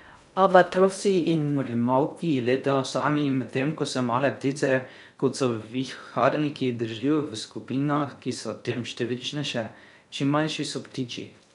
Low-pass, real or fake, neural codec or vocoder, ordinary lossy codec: 10.8 kHz; fake; codec, 16 kHz in and 24 kHz out, 0.6 kbps, FocalCodec, streaming, 2048 codes; none